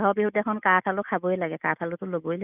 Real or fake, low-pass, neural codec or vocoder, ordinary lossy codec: real; 3.6 kHz; none; none